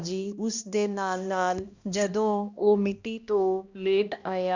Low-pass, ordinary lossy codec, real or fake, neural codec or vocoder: 7.2 kHz; Opus, 64 kbps; fake; codec, 16 kHz, 1 kbps, X-Codec, HuBERT features, trained on balanced general audio